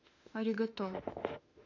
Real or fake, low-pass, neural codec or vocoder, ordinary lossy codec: fake; 7.2 kHz; autoencoder, 48 kHz, 32 numbers a frame, DAC-VAE, trained on Japanese speech; none